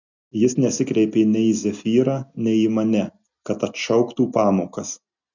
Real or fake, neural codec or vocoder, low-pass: real; none; 7.2 kHz